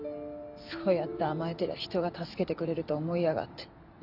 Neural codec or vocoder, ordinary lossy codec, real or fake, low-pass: none; none; real; 5.4 kHz